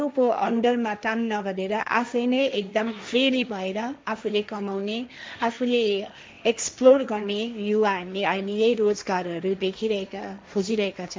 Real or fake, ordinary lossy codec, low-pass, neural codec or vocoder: fake; none; none; codec, 16 kHz, 1.1 kbps, Voila-Tokenizer